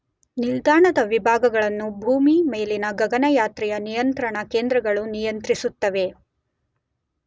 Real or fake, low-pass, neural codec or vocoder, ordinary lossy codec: real; none; none; none